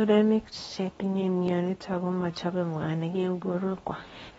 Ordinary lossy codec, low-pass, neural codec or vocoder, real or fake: AAC, 24 kbps; 10.8 kHz; codec, 24 kHz, 0.9 kbps, WavTokenizer, medium speech release version 2; fake